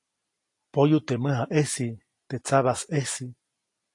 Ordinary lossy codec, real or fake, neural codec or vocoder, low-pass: AAC, 48 kbps; real; none; 10.8 kHz